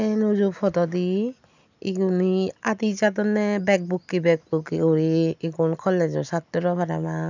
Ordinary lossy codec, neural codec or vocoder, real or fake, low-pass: none; none; real; 7.2 kHz